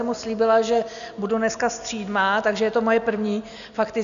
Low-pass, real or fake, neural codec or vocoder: 7.2 kHz; real; none